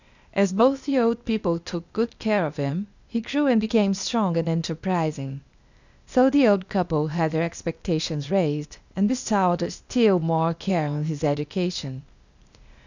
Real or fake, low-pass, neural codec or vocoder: fake; 7.2 kHz; codec, 16 kHz, 0.8 kbps, ZipCodec